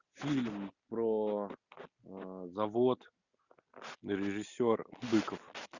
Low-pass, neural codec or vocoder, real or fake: 7.2 kHz; none; real